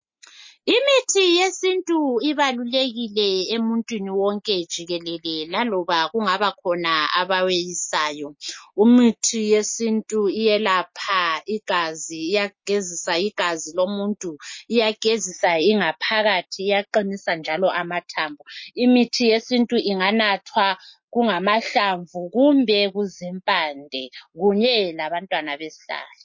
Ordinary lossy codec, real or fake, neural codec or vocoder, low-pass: MP3, 32 kbps; real; none; 7.2 kHz